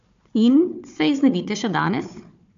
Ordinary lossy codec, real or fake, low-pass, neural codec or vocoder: AAC, 64 kbps; fake; 7.2 kHz; codec, 16 kHz, 4 kbps, FunCodec, trained on Chinese and English, 50 frames a second